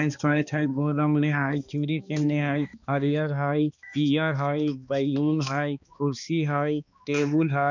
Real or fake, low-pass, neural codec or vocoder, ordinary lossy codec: fake; 7.2 kHz; codec, 16 kHz, 2 kbps, X-Codec, HuBERT features, trained on balanced general audio; none